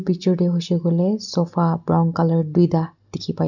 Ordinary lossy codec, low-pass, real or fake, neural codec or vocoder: none; 7.2 kHz; real; none